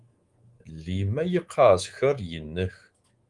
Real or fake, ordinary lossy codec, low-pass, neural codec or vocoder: fake; Opus, 24 kbps; 10.8 kHz; codec, 24 kHz, 3.1 kbps, DualCodec